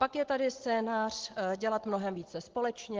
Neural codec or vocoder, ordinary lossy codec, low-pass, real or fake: none; Opus, 16 kbps; 7.2 kHz; real